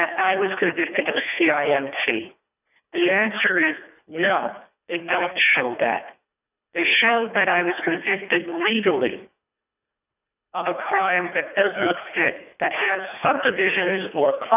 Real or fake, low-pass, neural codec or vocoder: fake; 3.6 kHz; codec, 24 kHz, 1.5 kbps, HILCodec